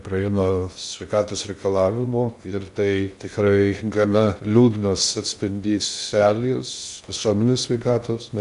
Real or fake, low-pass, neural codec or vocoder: fake; 10.8 kHz; codec, 16 kHz in and 24 kHz out, 0.6 kbps, FocalCodec, streaming, 2048 codes